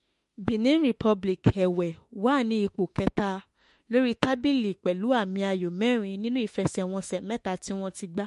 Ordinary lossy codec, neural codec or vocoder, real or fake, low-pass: MP3, 48 kbps; autoencoder, 48 kHz, 32 numbers a frame, DAC-VAE, trained on Japanese speech; fake; 14.4 kHz